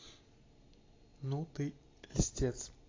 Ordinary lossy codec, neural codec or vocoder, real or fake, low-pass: AAC, 32 kbps; vocoder, 44.1 kHz, 128 mel bands every 256 samples, BigVGAN v2; fake; 7.2 kHz